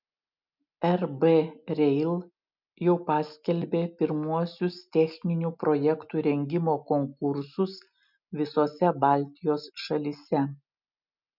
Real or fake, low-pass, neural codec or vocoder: real; 5.4 kHz; none